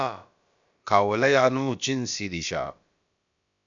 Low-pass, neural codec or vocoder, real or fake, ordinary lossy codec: 7.2 kHz; codec, 16 kHz, about 1 kbps, DyCAST, with the encoder's durations; fake; MP3, 64 kbps